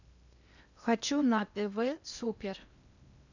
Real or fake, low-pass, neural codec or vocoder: fake; 7.2 kHz; codec, 16 kHz in and 24 kHz out, 0.8 kbps, FocalCodec, streaming, 65536 codes